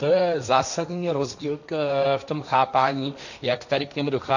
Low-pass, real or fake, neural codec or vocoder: 7.2 kHz; fake; codec, 16 kHz, 1.1 kbps, Voila-Tokenizer